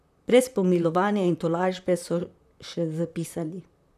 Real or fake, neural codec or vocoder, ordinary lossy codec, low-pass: fake; vocoder, 44.1 kHz, 128 mel bands, Pupu-Vocoder; none; 14.4 kHz